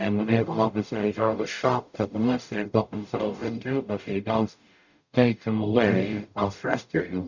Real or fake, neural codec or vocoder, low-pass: fake; codec, 44.1 kHz, 0.9 kbps, DAC; 7.2 kHz